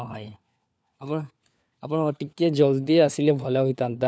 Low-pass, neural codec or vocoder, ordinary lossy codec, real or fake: none; codec, 16 kHz, 4 kbps, FunCodec, trained on LibriTTS, 50 frames a second; none; fake